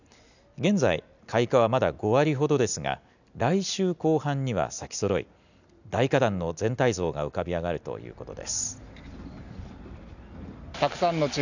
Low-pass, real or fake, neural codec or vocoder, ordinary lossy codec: 7.2 kHz; real; none; none